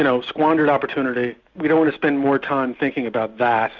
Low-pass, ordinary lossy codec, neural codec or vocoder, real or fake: 7.2 kHz; Opus, 64 kbps; none; real